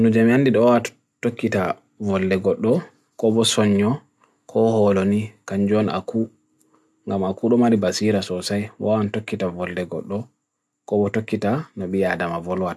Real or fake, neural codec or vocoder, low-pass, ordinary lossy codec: real; none; none; none